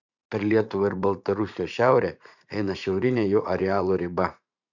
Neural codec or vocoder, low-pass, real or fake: vocoder, 44.1 kHz, 80 mel bands, Vocos; 7.2 kHz; fake